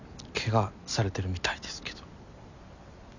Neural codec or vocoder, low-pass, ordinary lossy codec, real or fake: none; 7.2 kHz; none; real